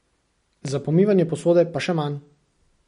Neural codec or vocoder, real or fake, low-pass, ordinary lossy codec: none; real; 10.8 kHz; MP3, 48 kbps